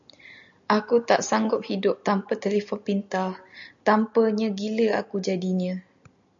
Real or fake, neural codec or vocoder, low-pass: real; none; 7.2 kHz